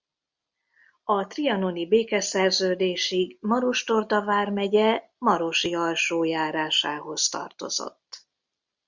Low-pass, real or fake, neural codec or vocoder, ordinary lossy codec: 7.2 kHz; real; none; Opus, 64 kbps